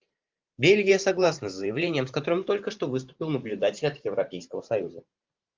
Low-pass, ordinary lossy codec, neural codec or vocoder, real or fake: 7.2 kHz; Opus, 24 kbps; vocoder, 44.1 kHz, 128 mel bands every 512 samples, BigVGAN v2; fake